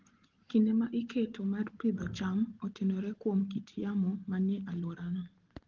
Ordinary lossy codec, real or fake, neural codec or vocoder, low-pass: Opus, 16 kbps; real; none; 7.2 kHz